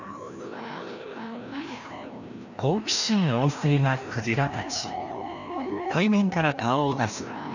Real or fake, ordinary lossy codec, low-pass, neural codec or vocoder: fake; none; 7.2 kHz; codec, 16 kHz, 1 kbps, FreqCodec, larger model